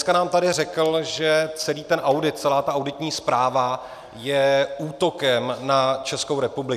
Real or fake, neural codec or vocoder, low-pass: real; none; 14.4 kHz